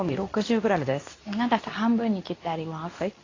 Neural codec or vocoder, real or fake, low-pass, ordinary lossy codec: codec, 24 kHz, 0.9 kbps, WavTokenizer, medium speech release version 2; fake; 7.2 kHz; AAC, 32 kbps